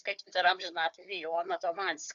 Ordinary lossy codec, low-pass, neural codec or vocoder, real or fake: MP3, 96 kbps; 7.2 kHz; codec, 16 kHz, 4 kbps, FunCodec, trained on Chinese and English, 50 frames a second; fake